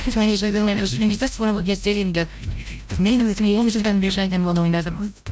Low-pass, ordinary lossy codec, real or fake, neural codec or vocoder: none; none; fake; codec, 16 kHz, 0.5 kbps, FreqCodec, larger model